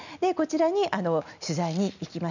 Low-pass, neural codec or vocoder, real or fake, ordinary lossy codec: 7.2 kHz; none; real; none